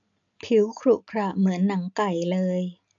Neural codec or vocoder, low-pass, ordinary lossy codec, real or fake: none; 7.2 kHz; AAC, 64 kbps; real